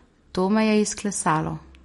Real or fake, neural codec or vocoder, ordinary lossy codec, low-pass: real; none; MP3, 48 kbps; 19.8 kHz